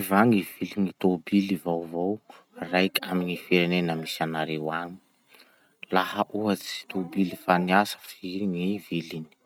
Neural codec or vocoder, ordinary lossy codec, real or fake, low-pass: none; none; real; 19.8 kHz